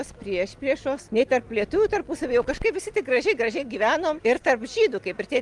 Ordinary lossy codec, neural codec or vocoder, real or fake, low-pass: Opus, 24 kbps; none; real; 10.8 kHz